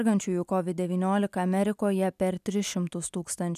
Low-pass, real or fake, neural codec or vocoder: 14.4 kHz; real; none